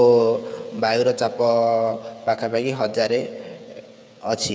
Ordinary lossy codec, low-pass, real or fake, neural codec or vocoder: none; none; fake; codec, 16 kHz, 8 kbps, FreqCodec, smaller model